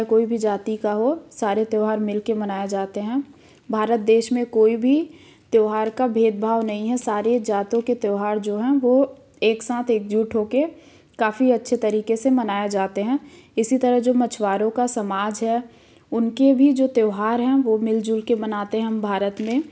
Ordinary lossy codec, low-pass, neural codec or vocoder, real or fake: none; none; none; real